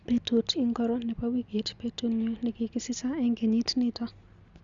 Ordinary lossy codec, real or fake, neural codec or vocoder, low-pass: Opus, 64 kbps; real; none; 7.2 kHz